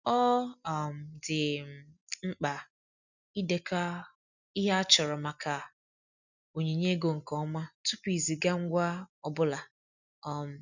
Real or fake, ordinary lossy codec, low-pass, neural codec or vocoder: real; none; 7.2 kHz; none